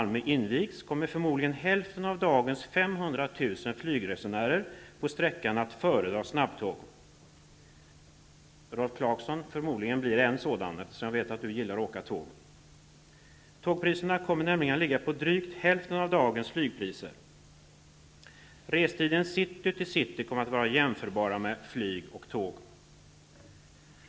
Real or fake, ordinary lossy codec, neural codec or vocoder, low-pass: real; none; none; none